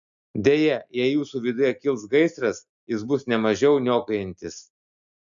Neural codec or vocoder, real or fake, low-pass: none; real; 7.2 kHz